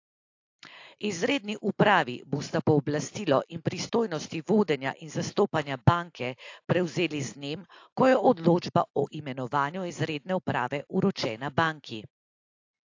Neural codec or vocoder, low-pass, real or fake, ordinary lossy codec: none; 7.2 kHz; real; AAC, 48 kbps